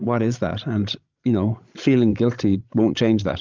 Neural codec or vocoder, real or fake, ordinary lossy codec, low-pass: codec, 16 kHz, 16 kbps, FreqCodec, larger model; fake; Opus, 32 kbps; 7.2 kHz